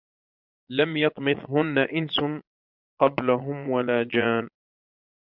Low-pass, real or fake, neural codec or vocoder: 5.4 kHz; fake; vocoder, 22.05 kHz, 80 mel bands, Vocos